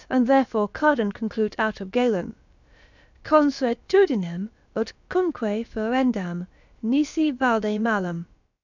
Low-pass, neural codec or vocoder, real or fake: 7.2 kHz; codec, 16 kHz, about 1 kbps, DyCAST, with the encoder's durations; fake